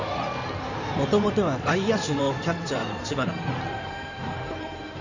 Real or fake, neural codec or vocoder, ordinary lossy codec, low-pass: fake; codec, 16 kHz in and 24 kHz out, 2.2 kbps, FireRedTTS-2 codec; none; 7.2 kHz